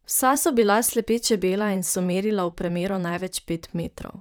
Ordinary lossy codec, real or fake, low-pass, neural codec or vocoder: none; fake; none; vocoder, 44.1 kHz, 128 mel bands, Pupu-Vocoder